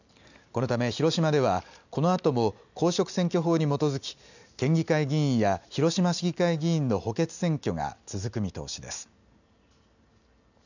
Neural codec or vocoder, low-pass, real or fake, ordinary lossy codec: none; 7.2 kHz; real; none